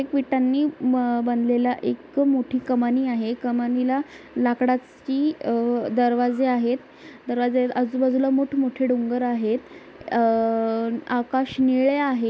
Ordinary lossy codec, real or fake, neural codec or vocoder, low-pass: none; real; none; none